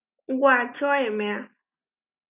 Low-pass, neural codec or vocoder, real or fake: 3.6 kHz; none; real